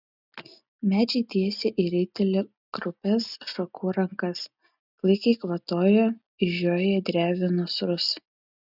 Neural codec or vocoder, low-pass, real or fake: none; 5.4 kHz; real